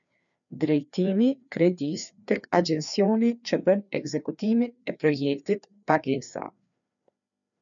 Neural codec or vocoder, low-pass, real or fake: codec, 16 kHz, 2 kbps, FreqCodec, larger model; 7.2 kHz; fake